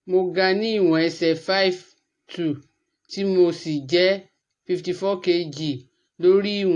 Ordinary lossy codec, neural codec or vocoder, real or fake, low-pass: AAC, 48 kbps; none; real; 10.8 kHz